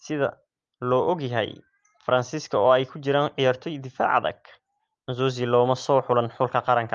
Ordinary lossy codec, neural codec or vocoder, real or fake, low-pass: Opus, 32 kbps; none; real; 7.2 kHz